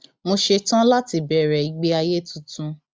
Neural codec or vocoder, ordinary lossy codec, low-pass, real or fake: none; none; none; real